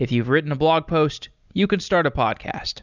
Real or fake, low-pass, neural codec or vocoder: real; 7.2 kHz; none